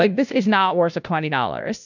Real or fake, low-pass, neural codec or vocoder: fake; 7.2 kHz; codec, 16 kHz, 0.5 kbps, FunCodec, trained on Chinese and English, 25 frames a second